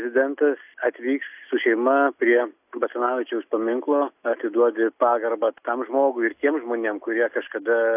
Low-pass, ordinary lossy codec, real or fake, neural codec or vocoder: 3.6 kHz; AAC, 32 kbps; real; none